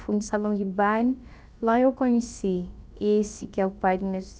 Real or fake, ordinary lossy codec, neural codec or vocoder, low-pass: fake; none; codec, 16 kHz, about 1 kbps, DyCAST, with the encoder's durations; none